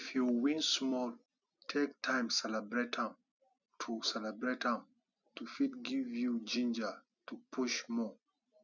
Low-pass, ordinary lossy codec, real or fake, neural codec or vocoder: 7.2 kHz; none; real; none